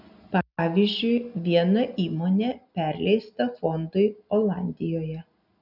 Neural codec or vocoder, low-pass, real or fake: none; 5.4 kHz; real